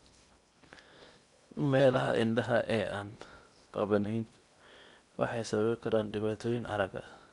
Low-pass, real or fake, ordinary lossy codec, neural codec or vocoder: 10.8 kHz; fake; MP3, 96 kbps; codec, 16 kHz in and 24 kHz out, 0.8 kbps, FocalCodec, streaming, 65536 codes